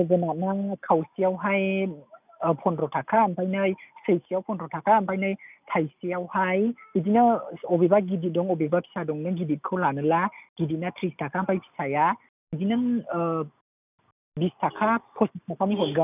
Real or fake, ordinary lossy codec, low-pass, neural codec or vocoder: real; none; 3.6 kHz; none